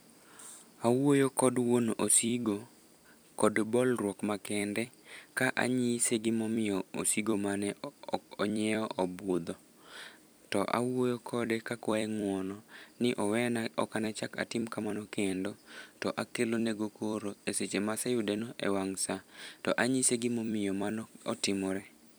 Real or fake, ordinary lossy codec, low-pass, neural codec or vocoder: fake; none; none; vocoder, 44.1 kHz, 128 mel bands every 256 samples, BigVGAN v2